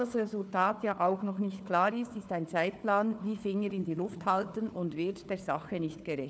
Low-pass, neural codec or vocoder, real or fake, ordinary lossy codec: none; codec, 16 kHz, 4 kbps, FunCodec, trained on Chinese and English, 50 frames a second; fake; none